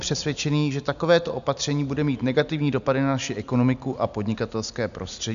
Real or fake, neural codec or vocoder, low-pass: real; none; 7.2 kHz